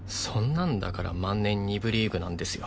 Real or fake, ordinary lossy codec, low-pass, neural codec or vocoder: real; none; none; none